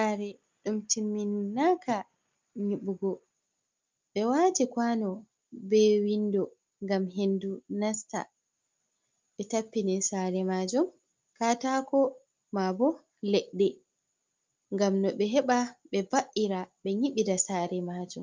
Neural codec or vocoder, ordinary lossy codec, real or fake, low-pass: none; Opus, 24 kbps; real; 7.2 kHz